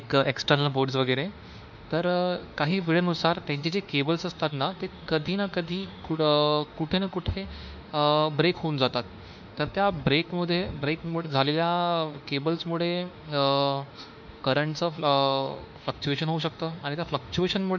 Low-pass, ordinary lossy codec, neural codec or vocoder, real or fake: 7.2 kHz; MP3, 64 kbps; autoencoder, 48 kHz, 32 numbers a frame, DAC-VAE, trained on Japanese speech; fake